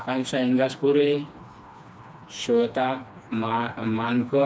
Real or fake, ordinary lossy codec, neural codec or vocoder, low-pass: fake; none; codec, 16 kHz, 2 kbps, FreqCodec, smaller model; none